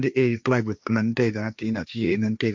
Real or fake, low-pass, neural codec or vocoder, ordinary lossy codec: fake; none; codec, 16 kHz, 1.1 kbps, Voila-Tokenizer; none